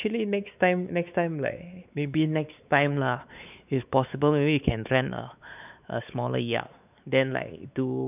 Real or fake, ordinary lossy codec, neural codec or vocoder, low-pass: fake; none; codec, 16 kHz, 4 kbps, X-Codec, WavLM features, trained on Multilingual LibriSpeech; 3.6 kHz